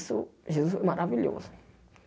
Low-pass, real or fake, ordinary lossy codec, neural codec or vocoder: none; real; none; none